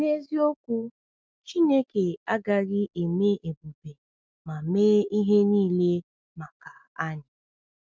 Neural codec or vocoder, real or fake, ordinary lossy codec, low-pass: none; real; none; none